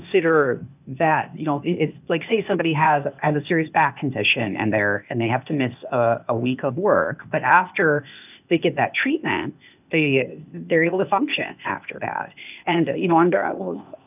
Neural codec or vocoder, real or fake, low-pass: codec, 16 kHz, 0.8 kbps, ZipCodec; fake; 3.6 kHz